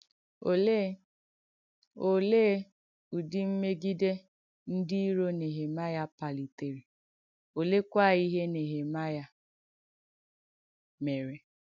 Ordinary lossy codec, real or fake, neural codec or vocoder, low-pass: none; real; none; 7.2 kHz